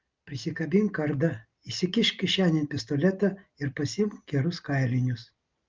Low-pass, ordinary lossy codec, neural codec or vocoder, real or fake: 7.2 kHz; Opus, 32 kbps; none; real